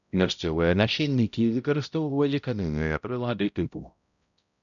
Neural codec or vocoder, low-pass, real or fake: codec, 16 kHz, 0.5 kbps, X-Codec, HuBERT features, trained on balanced general audio; 7.2 kHz; fake